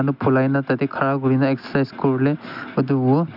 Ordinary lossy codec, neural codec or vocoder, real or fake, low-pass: none; none; real; 5.4 kHz